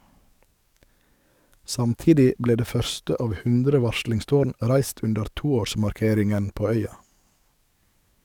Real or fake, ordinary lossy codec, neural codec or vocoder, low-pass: fake; none; codec, 44.1 kHz, 7.8 kbps, DAC; 19.8 kHz